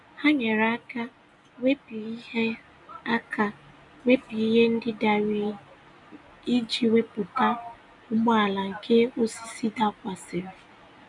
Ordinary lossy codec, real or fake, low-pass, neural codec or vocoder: none; real; 10.8 kHz; none